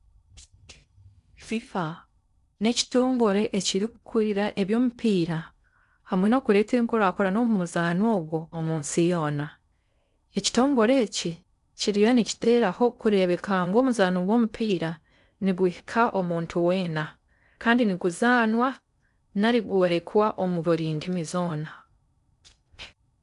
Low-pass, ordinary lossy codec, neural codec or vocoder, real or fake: 10.8 kHz; none; codec, 16 kHz in and 24 kHz out, 0.6 kbps, FocalCodec, streaming, 4096 codes; fake